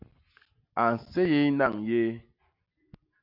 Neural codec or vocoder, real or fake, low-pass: none; real; 5.4 kHz